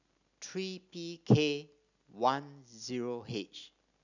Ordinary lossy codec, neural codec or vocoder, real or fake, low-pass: none; none; real; 7.2 kHz